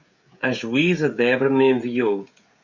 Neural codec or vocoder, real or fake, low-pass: codec, 16 kHz, 16 kbps, FreqCodec, smaller model; fake; 7.2 kHz